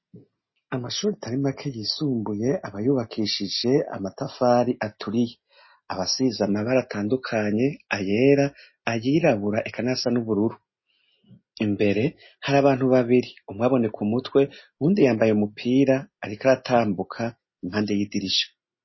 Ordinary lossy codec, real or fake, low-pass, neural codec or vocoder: MP3, 24 kbps; real; 7.2 kHz; none